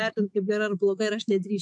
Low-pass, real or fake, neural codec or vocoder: 10.8 kHz; fake; autoencoder, 48 kHz, 128 numbers a frame, DAC-VAE, trained on Japanese speech